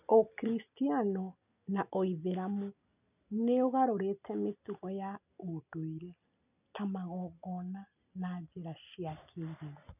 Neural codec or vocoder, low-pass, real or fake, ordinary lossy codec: none; 3.6 kHz; real; none